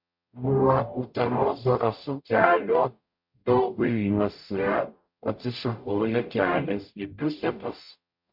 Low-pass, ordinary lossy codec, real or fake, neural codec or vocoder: 5.4 kHz; MP3, 48 kbps; fake; codec, 44.1 kHz, 0.9 kbps, DAC